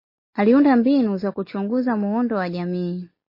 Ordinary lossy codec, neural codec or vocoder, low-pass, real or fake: MP3, 24 kbps; none; 5.4 kHz; real